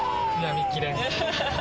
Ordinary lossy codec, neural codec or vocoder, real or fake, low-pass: none; none; real; none